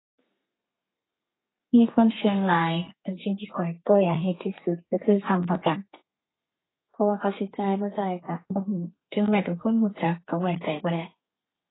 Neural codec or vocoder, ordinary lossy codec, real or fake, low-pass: codec, 32 kHz, 1.9 kbps, SNAC; AAC, 16 kbps; fake; 7.2 kHz